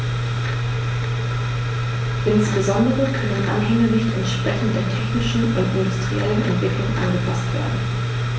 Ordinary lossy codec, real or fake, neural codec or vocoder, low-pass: none; real; none; none